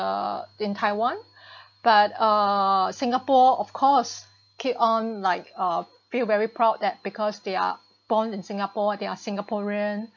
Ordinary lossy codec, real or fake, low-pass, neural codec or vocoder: MP3, 48 kbps; real; 7.2 kHz; none